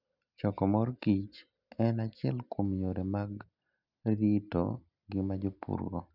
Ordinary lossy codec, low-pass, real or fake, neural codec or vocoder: none; 5.4 kHz; real; none